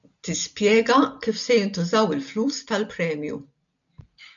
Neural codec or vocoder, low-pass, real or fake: none; 7.2 kHz; real